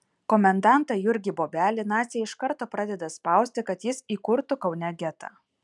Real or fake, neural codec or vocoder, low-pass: real; none; 10.8 kHz